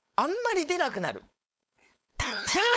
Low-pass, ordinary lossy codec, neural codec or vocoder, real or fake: none; none; codec, 16 kHz, 4.8 kbps, FACodec; fake